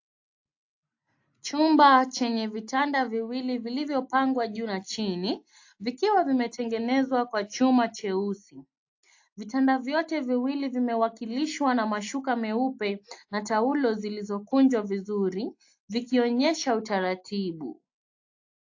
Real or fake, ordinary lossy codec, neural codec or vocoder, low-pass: real; AAC, 48 kbps; none; 7.2 kHz